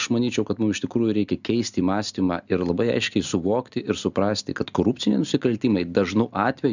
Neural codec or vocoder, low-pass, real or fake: none; 7.2 kHz; real